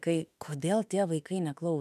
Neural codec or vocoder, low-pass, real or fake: autoencoder, 48 kHz, 32 numbers a frame, DAC-VAE, trained on Japanese speech; 14.4 kHz; fake